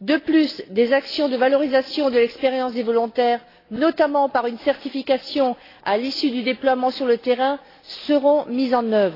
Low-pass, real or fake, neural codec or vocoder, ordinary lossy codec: 5.4 kHz; real; none; AAC, 24 kbps